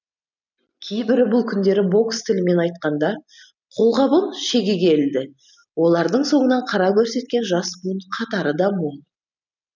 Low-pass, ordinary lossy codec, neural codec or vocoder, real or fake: 7.2 kHz; none; none; real